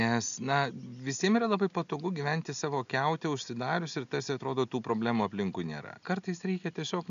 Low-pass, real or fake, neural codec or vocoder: 7.2 kHz; real; none